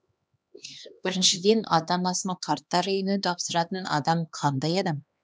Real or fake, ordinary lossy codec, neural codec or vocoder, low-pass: fake; none; codec, 16 kHz, 2 kbps, X-Codec, HuBERT features, trained on LibriSpeech; none